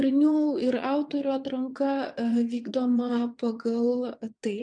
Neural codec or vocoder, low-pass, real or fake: vocoder, 22.05 kHz, 80 mel bands, WaveNeXt; 9.9 kHz; fake